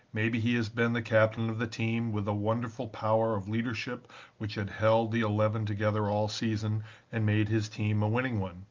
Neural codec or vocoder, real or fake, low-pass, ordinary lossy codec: none; real; 7.2 kHz; Opus, 24 kbps